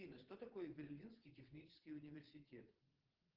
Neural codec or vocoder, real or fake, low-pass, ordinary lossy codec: vocoder, 22.05 kHz, 80 mel bands, WaveNeXt; fake; 5.4 kHz; Opus, 16 kbps